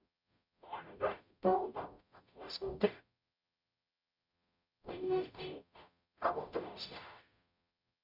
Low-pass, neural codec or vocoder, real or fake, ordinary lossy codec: 5.4 kHz; codec, 44.1 kHz, 0.9 kbps, DAC; fake; none